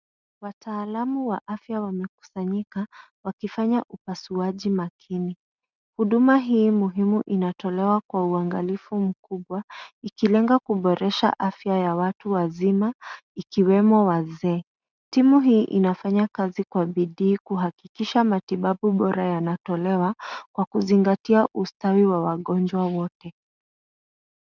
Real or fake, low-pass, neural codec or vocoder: real; 7.2 kHz; none